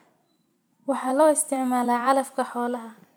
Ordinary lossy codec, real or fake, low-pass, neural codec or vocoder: none; fake; none; vocoder, 44.1 kHz, 128 mel bands every 256 samples, BigVGAN v2